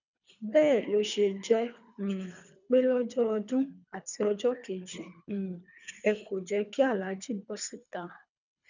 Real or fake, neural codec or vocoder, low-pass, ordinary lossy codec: fake; codec, 24 kHz, 3 kbps, HILCodec; 7.2 kHz; none